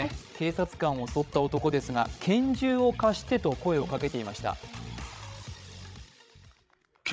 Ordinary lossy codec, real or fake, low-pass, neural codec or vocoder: none; fake; none; codec, 16 kHz, 16 kbps, FreqCodec, larger model